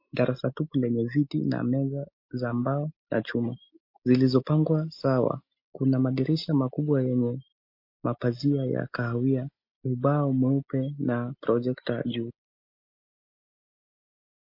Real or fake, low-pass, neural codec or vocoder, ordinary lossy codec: real; 5.4 kHz; none; MP3, 32 kbps